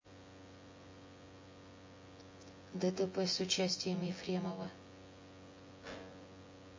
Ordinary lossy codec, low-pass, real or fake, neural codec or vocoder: MP3, 32 kbps; 7.2 kHz; fake; vocoder, 24 kHz, 100 mel bands, Vocos